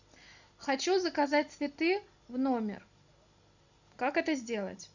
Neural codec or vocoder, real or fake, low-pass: none; real; 7.2 kHz